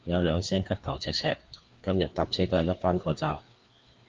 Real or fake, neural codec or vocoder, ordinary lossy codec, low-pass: fake; codec, 16 kHz, 2 kbps, FreqCodec, larger model; Opus, 32 kbps; 7.2 kHz